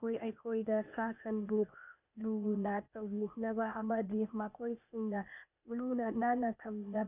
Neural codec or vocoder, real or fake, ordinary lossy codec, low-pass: codec, 16 kHz, 0.8 kbps, ZipCodec; fake; none; 3.6 kHz